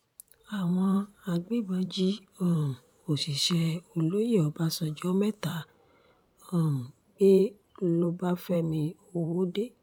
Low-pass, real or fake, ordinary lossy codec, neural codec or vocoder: 19.8 kHz; fake; none; vocoder, 44.1 kHz, 128 mel bands every 512 samples, BigVGAN v2